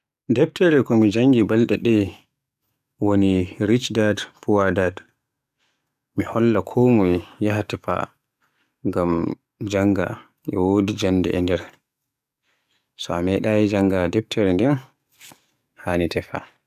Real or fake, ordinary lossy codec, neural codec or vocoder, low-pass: fake; none; codec, 44.1 kHz, 7.8 kbps, DAC; 14.4 kHz